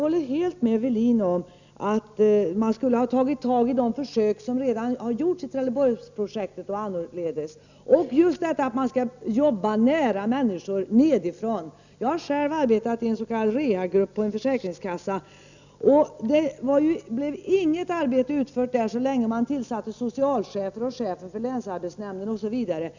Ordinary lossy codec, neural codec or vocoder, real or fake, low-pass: none; none; real; 7.2 kHz